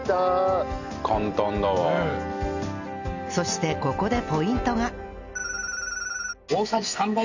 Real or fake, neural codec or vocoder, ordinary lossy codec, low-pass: real; none; none; 7.2 kHz